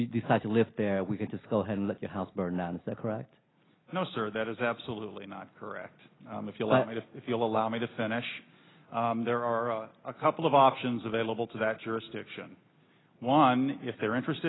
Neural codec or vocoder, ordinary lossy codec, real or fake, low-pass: none; AAC, 16 kbps; real; 7.2 kHz